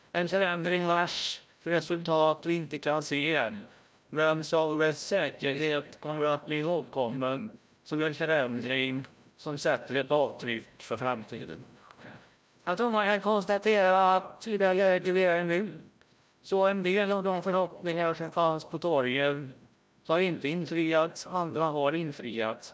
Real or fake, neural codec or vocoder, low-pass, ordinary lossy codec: fake; codec, 16 kHz, 0.5 kbps, FreqCodec, larger model; none; none